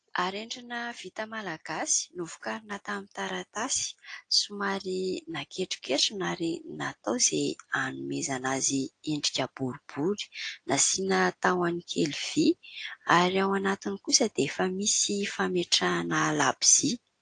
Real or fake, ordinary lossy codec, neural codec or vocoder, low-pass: real; AAC, 48 kbps; none; 9.9 kHz